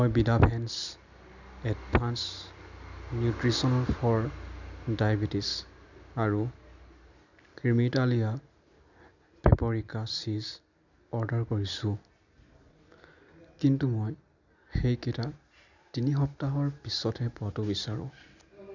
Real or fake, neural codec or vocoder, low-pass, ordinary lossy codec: real; none; 7.2 kHz; none